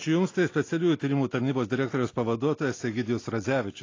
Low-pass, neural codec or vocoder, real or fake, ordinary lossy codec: 7.2 kHz; none; real; AAC, 32 kbps